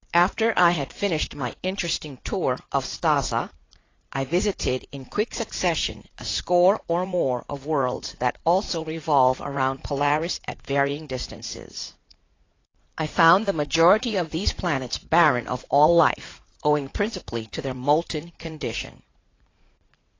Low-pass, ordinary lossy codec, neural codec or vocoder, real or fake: 7.2 kHz; AAC, 32 kbps; vocoder, 44.1 kHz, 80 mel bands, Vocos; fake